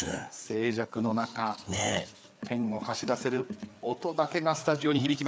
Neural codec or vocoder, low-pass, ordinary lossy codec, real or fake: codec, 16 kHz, 4 kbps, FreqCodec, larger model; none; none; fake